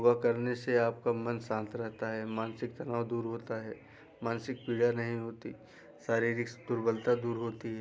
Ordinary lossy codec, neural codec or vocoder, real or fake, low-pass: none; none; real; none